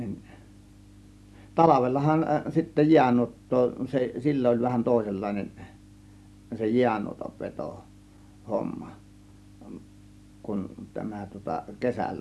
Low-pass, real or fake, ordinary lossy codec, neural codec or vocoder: none; real; none; none